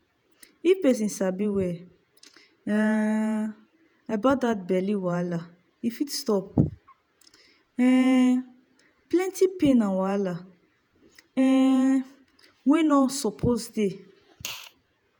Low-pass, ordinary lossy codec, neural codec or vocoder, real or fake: none; none; vocoder, 48 kHz, 128 mel bands, Vocos; fake